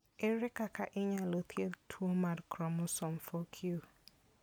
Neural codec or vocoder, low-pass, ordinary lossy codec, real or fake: none; none; none; real